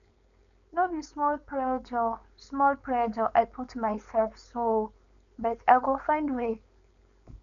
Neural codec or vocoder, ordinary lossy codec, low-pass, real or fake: codec, 16 kHz, 4.8 kbps, FACodec; none; 7.2 kHz; fake